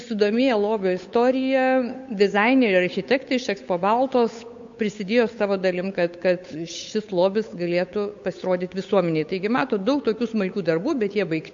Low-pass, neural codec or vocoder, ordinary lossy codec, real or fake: 7.2 kHz; codec, 16 kHz, 8 kbps, FunCodec, trained on Chinese and English, 25 frames a second; MP3, 48 kbps; fake